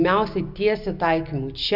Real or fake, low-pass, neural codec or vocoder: real; 5.4 kHz; none